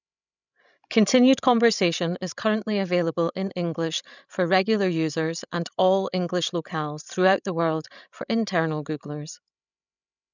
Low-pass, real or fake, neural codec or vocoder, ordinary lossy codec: 7.2 kHz; fake; codec, 16 kHz, 16 kbps, FreqCodec, larger model; none